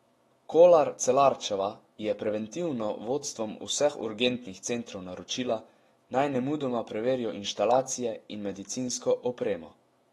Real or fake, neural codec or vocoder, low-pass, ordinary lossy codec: fake; autoencoder, 48 kHz, 128 numbers a frame, DAC-VAE, trained on Japanese speech; 19.8 kHz; AAC, 32 kbps